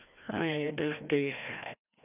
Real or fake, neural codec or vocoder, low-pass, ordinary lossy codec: fake; codec, 16 kHz, 1 kbps, FreqCodec, larger model; 3.6 kHz; none